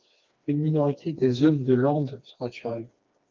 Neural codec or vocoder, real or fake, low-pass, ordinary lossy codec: codec, 16 kHz, 2 kbps, FreqCodec, smaller model; fake; 7.2 kHz; Opus, 32 kbps